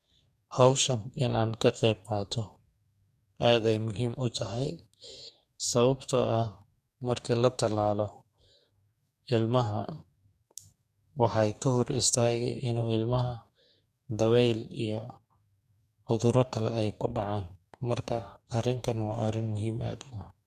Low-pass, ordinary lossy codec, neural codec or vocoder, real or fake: 14.4 kHz; none; codec, 44.1 kHz, 2.6 kbps, DAC; fake